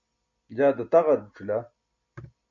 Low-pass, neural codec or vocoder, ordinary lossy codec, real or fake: 7.2 kHz; none; MP3, 48 kbps; real